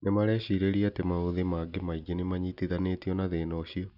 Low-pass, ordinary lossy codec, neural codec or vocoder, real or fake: 5.4 kHz; none; none; real